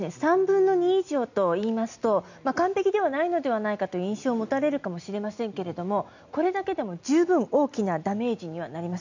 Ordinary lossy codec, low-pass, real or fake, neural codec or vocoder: none; 7.2 kHz; real; none